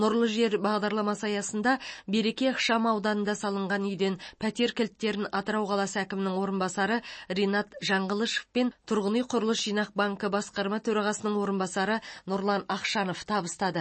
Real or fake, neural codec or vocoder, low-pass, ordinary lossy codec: real; none; 9.9 kHz; MP3, 32 kbps